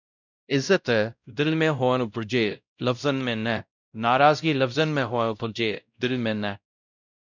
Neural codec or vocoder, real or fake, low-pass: codec, 16 kHz, 0.5 kbps, X-Codec, WavLM features, trained on Multilingual LibriSpeech; fake; 7.2 kHz